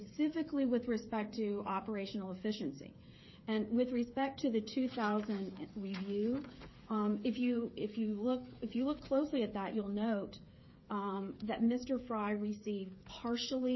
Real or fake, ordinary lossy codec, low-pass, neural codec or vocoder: fake; MP3, 24 kbps; 7.2 kHz; codec, 16 kHz, 8 kbps, FreqCodec, smaller model